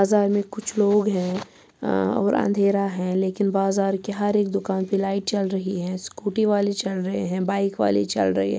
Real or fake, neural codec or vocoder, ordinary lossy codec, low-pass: real; none; none; none